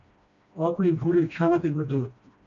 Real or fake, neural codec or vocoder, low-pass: fake; codec, 16 kHz, 1 kbps, FreqCodec, smaller model; 7.2 kHz